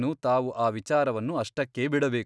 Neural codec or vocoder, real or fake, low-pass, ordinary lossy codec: none; real; none; none